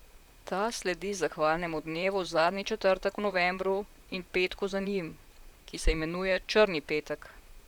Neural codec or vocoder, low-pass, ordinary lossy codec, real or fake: vocoder, 44.1 kHz, 128 mel bands, Pupu-Vocoder; 19.8 kHz; none; fake